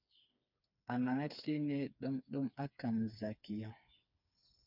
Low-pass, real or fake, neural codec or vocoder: 5.4 kHz; fake; codec, 16 kHz, 4 kbps, FreqCodec, smaller model